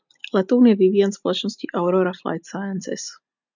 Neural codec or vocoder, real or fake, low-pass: none; real; 7.2 kHz